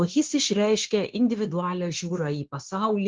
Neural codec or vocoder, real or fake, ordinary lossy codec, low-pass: codec, 16 kHz, 0.9 kbps, LongCat-Audio-Codec; fake; Opus, 16 kbps; 7.2 kHz